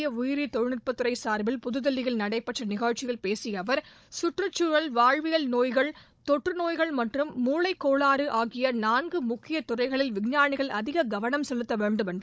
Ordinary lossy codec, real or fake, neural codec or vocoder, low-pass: none; fake; codec, 16 kHz, 16 kbps, FunCodec, trained on Chinese and English, 50 frames a second; none